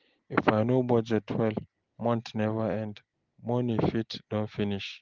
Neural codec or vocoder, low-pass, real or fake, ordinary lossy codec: none; 7.2 kHz; real; Opus, 16 kbps